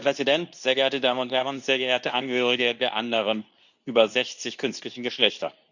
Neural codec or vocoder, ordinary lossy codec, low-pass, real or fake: codec, 24 kHz, 0.9 kbps, WavTokenizer, medium speech release version 2; none; 7.2 kHz; fake